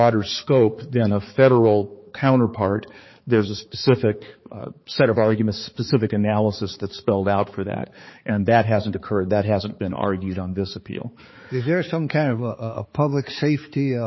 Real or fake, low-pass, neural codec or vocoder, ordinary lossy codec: fake; 7.2 kHz; codec, 16 kHz, 4 kbps, X-Codec, HuBERT features, trained on balanced general audio; MP3, 24 kbps